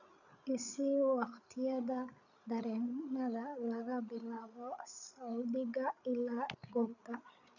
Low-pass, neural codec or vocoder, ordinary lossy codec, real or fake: 7.2 kHz; codec, 16 kHz, 8 kbps, FreqCodec, larger model; none; fake